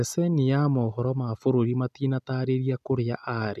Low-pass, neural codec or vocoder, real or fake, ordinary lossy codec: 14.4 kHz; none; real; none